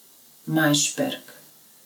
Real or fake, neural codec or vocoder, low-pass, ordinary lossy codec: real; none; none; none